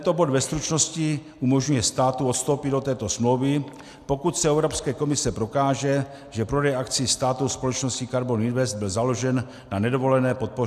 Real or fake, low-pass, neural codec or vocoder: real; 14.4 kHz; none